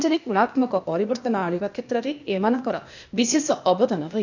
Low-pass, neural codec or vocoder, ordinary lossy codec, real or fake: 7.2 kHz; codec, 16 kHz, 0.8 kbps, ZipCodec; none; fake